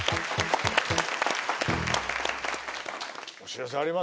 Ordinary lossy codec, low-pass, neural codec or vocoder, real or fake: none; none; none; real